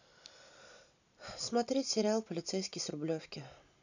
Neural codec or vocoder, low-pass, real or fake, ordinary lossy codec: none; 7.2 kHz; real; none